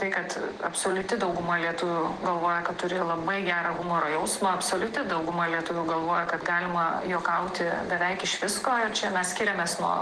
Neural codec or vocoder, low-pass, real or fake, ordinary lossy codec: none; 10.8 kHz; real; Opus, 16 kbps